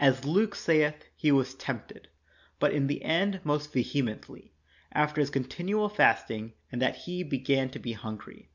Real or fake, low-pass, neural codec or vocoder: real; 7.2 kHz; none